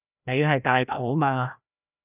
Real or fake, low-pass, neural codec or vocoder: fake; 3.6 kHz; codec, 16 kHz, 1 kbps, FreqCodec, larger model